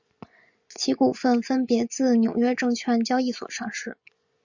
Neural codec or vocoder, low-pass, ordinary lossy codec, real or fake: vocoder, 24 kHz, 100 mel bands, Vocos; 7.2 kHz; Opus, 64 kbps; fake